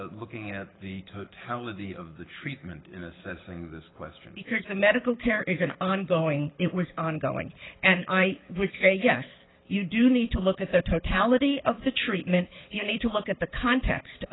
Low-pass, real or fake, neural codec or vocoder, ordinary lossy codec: 7.2 kHz; fake; codec, 24 kHz, 6 kbps, HILCodec; AAC, 16 kbps